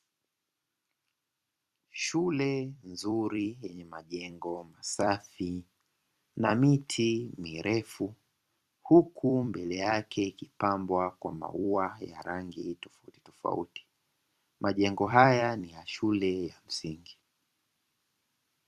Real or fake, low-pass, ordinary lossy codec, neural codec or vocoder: fake; 14.4 kHz; Opus, 64 kbps; vocoder, 44.1 kHz, 128 mel bands every 256 samples, BigVGAN v2